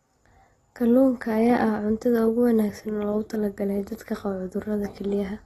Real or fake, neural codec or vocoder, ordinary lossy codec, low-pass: real; none; AAC, 32 kbps; 14.4 kHz